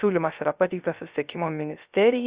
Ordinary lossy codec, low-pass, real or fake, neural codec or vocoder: Opus, 64 kbps; 3.6 kHz; fake; codec, 16 kHz, 0.3 kbps, FocalCodec